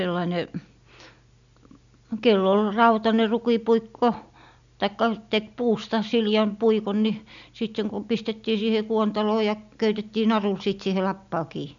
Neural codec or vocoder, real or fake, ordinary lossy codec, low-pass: none; real; none; 7.2 kHz